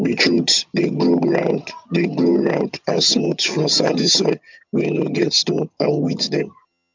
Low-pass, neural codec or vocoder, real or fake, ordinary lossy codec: 7.2 kHz; vocoder, 22.05 kHz, 80 mel bands, HiFi-GAN; fake; MP3, 64 kbps